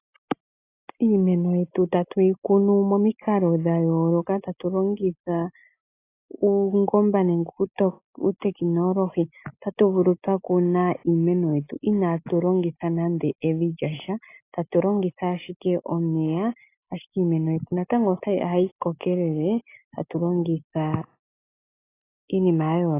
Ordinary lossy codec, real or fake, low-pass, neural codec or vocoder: AAC, 24 kbps; real; 3.6 kHz; none